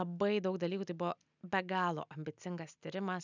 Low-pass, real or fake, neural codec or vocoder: 7.2 kHz; real; none